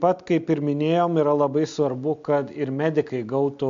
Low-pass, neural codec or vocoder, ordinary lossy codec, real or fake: 7.2 kHz; none; MP3, 64 kbps; real